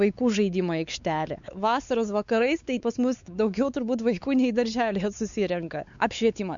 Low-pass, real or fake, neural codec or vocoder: 7.2 kHz; fake; codec, 16 kHz, 4 kbps, X-Codec, WavLM features, trained on Multilingual LibriSpeech